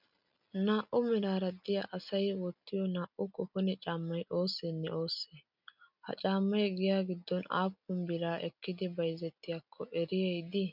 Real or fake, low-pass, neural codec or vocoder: real; 5.4 kHz; none